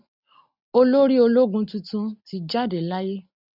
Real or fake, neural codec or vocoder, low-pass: real; none; 5.4 kHz